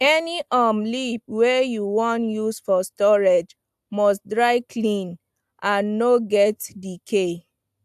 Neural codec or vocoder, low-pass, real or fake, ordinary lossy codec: none; 14.4 kHz; real; AAC, 96 kbps